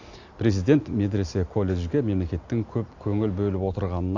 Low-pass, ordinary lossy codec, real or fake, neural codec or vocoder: 7.2 kHz; AAC, 48 kbps; real; none